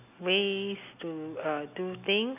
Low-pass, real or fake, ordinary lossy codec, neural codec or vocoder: 3.6 kHz; real; none; none